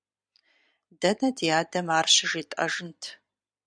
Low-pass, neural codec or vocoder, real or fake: 9.9 kHz; vocoder, 44.1 kHz, 128 mel bands every 256 samples, BigVGAN v2; fake